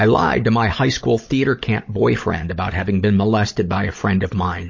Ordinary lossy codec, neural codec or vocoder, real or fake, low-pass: MP3, 32 kbps; codec, 16 kHz, 16 kbps, FunCodec, trained on Chinese and English, 50 frames a second; fake; 7.2 kHz